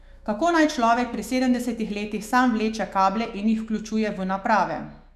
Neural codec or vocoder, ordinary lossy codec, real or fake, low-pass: autoencoder, 48 kHz, 128 numbers a frame, DAC-VAE, trained on Japanese speech; none; fake; 14.4 kHz